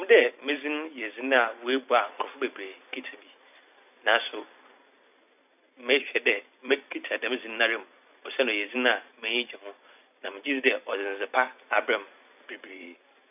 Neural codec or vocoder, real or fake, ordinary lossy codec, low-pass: vocoder, 44.1 kHz, 128 mel bands every 256 samples, BigVGAN v2; fake; none; 3.6 kHz